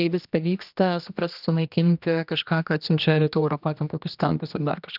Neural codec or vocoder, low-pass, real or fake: codec, 16 kHz, 1 kbps, X-Codec, HuBERT features, trained on general audio; 5.4 kHz; fake